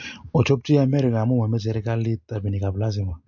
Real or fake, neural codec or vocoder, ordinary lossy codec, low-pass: real; none; MP3, 48 kbps; 7.2 kHz